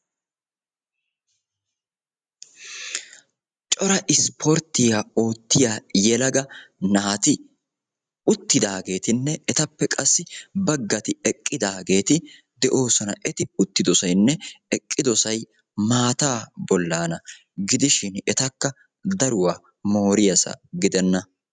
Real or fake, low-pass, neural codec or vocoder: real; 9.9 kHz; none